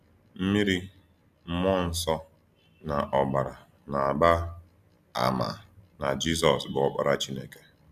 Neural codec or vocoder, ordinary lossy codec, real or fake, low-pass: none; none; real; 14.4 kHz